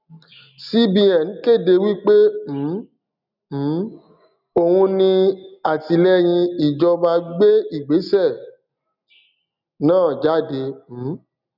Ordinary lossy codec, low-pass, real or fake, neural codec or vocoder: none; 5.4 kHz; real; none